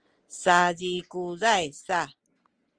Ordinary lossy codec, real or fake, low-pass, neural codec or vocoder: Opus, 24 kbps; real; 9.9 kHz; none